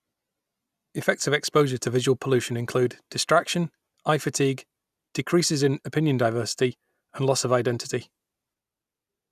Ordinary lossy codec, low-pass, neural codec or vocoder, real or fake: none; 14.4 kHz; none; real